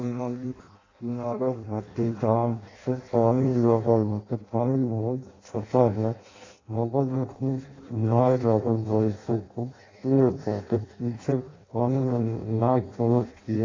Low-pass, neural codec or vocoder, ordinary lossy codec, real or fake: 7.2 kHz; codec, 16 kHz in and 24 kHz out, 0.6 kbps, FireRedTTS-2 codec; AAC, 32 kbps; fake